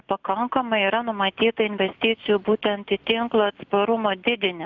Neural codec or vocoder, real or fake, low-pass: none; real; 7.2 kHz